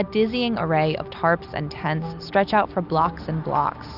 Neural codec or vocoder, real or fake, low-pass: none; real; 5.4 kHz